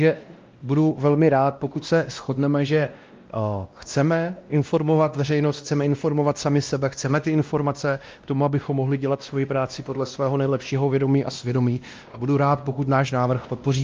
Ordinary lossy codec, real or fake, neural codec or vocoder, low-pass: Opus, 32 kbps; fake; codec, 16 kHz, 1 kbps, X-Codec, WavLM features, trained on Multilingual LibriSpeech; 7.2 kHz